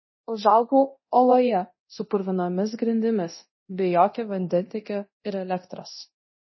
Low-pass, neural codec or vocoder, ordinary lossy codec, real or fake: 7.2 kHz; codec, 24 kHz, 0.9 kbps, DualCodec; MP3, 24 kbps; fake